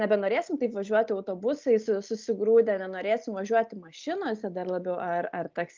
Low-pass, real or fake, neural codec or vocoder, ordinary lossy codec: 7.2 kHz; real; none; Opus, 24 kbps